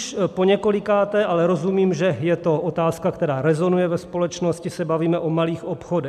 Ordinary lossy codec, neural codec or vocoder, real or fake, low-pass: MP3, 96 kbps; none; real; 14.4 kHz